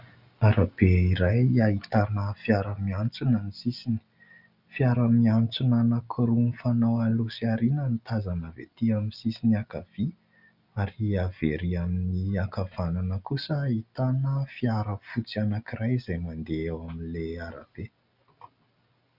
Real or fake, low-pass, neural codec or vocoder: real; 5.4 kHz; none